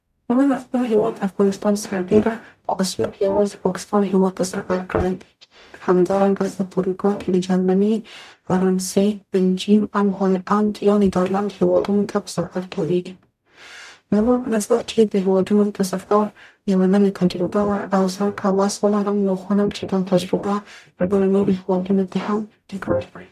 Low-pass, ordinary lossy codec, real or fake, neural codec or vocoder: 14.4 kHz; none; fake; codec, 44.1 kHz, 0.9 kbps, DAC